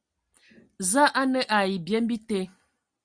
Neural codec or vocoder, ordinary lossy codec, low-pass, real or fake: none; Opus, 64 kbps; 9.9 kHz; real